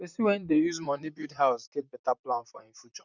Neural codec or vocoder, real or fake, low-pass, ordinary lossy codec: vocoder, 44.1 kHz, 128 mel bands, Pupu-Vocoder; fake; 7.2 kHz; none